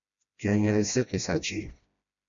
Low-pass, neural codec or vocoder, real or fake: 7.2 kHz; codec, 16 kHz, 1 kbps, FreqCodec, smaller model; fake